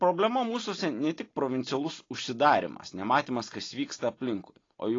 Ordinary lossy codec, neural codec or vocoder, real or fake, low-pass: AAC, 32 kbps; none; real; 7.2 kHz